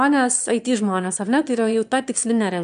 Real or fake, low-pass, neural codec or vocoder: fake; 9.9 kHz; autoencoder, 22.05 kHz, a latent of 192 numbers a frame, VITS, trained on one speaker